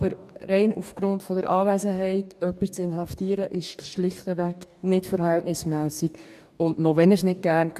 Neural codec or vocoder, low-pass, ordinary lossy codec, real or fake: codec, 44.1 kHz, 2.6 kbps, DAC; 14.4 kHz; AAC, 96 kbps; fake